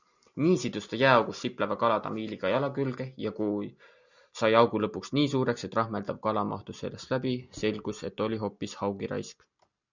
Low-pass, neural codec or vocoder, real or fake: 7.2 kHz; none; real